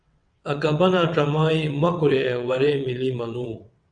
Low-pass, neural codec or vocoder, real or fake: 9.9 kHz; vocoder, 22.05 kHz, 80 mel bands, WaveNeXt; fake